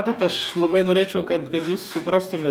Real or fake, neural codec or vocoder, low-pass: fake; codec, 44.1 kHz, 2.6 kbps, DAC; 19.8 kHz